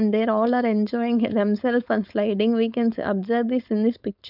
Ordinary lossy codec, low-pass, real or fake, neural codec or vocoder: none; 5.4 kHz; fake; codec, 16 kHz, 4.8 kbps, FACodec